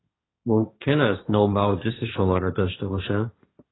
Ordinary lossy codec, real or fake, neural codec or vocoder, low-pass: AAC, 16 kbps; fake; codec, 16 kHz, 1.1 kbps, Voila-Tokenizer; 7.2 kHz